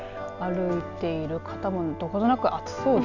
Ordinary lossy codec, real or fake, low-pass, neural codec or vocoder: none; real; 7.2 kHz; none